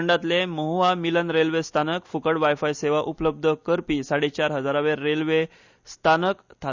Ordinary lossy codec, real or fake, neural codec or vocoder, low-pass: Opus, 64 kbps; real; none; 7.2 kHz